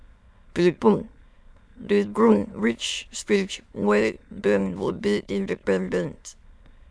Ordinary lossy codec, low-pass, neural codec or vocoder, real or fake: none; none; autoencoder, 22.05 kHz, a latent of 192 numbers a frame, VITS, trained on many speakers; fake